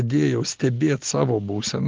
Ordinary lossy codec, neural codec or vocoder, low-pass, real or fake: Opus, 16 kbps; none; 7.2 kHz; real